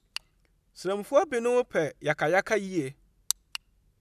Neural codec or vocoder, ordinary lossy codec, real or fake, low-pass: none; none; real; 14.4 kHz